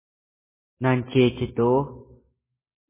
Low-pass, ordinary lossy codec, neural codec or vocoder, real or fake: 3.6 kHz; MP3, 16 kbps; none; real